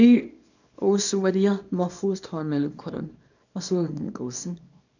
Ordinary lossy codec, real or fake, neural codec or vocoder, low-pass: none; fake; codec, 24 kHz, 0.9 kbps, WavTokenizer, small release; 7.2 kHz